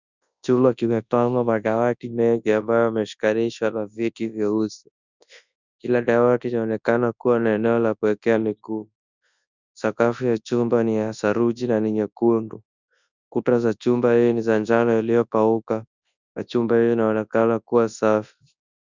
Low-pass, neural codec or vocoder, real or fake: 7.2 kHz; codec, 24 kHz, 0.9 kbps, WavTokenizer, large speech release; fake